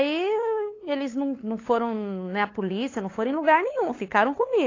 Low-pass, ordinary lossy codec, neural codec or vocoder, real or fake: 7.2 kHz; AAC, 32 kbps; codec, 16 kHz, 8 kbps, FunCodec, trained on LibriTTS, 25 frames a second; fake